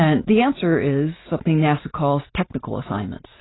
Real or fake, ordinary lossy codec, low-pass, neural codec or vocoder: real; AAC, 16 kbps; 7.2 kHz; none